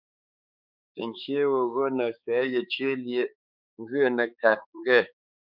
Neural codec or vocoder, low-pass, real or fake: codec, 16 kHz, 4 kbps, X-Codec, HuBERT features, trained on balanced general audio; 5.4 kHz; fake